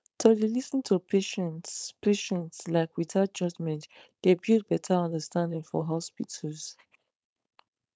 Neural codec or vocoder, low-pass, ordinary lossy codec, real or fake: codec, 16 kHz, 4.8 kbps, FACodec; none; none; fake